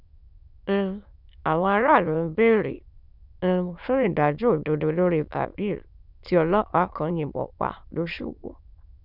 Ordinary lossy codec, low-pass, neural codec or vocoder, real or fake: none; 5.4 kHz; autoencoder, 22.05 kHz, a latent of 192 numbers a frame, VITS, trained on many speakers; fake